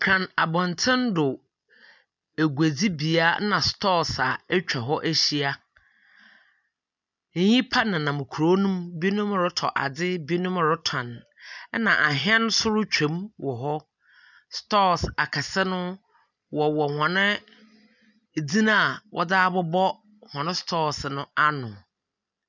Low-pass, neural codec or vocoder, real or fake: 7.2 kHz; none; real